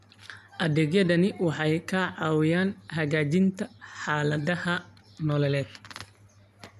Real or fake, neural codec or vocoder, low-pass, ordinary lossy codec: real; none; 14.4 kHz; none